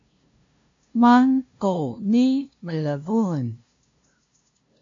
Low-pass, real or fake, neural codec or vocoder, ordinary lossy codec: 7.2 kHz; fake; codec, 16 kHz, 0.5 kbps, FunCodec, trained on LibriTTS, 25 frames a second; AAC, 64 kbps